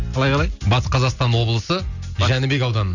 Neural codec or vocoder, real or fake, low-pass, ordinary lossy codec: none; real; 7.2 kHz; none